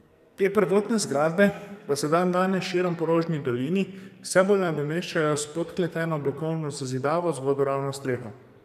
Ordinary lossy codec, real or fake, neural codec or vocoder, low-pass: none; fake; codec, 44.1 kHz, 2.6 kbps, SNAC; 14.4 kHz